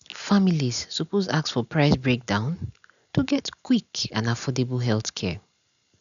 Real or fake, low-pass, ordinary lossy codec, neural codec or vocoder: real; 7.2 kHz; none; none